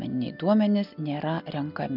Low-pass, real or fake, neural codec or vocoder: 5.4 kHz; real; none